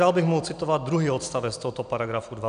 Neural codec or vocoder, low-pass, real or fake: none; 9.9 kHz; real